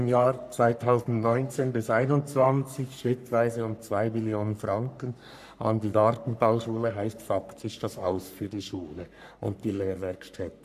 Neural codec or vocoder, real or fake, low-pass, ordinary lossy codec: codec, 44.1 kHz, 3.4 kbps, Pupu-Codec; fake; 14.4 kHz; none